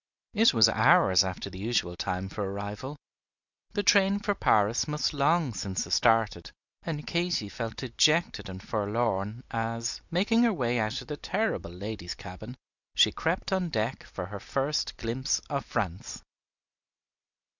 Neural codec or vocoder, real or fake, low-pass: none; real; 7.2 kHz